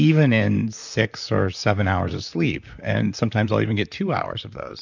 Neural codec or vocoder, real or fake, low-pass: vocoder, 44.1 kHz, 128 mel bands, Pupu-Vocoder; fake; 7.2 kHz